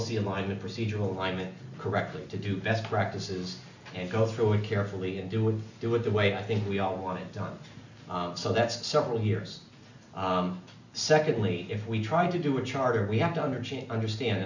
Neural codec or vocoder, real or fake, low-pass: none; real; 7.2 kHz